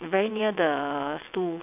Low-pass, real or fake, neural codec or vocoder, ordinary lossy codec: 3.6 kHz; fake; vocoder, 22.05 kHz, 80 mel bands, WaveNeXt; none